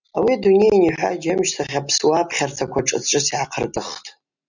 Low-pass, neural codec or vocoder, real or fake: 7.2 kHz; none; real